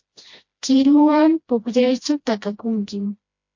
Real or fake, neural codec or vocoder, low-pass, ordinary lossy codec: fake; codec, 16 kHz, 1 kbps, FreqCodec, smaller model; 7.2 kHz; MP3, 48 kbps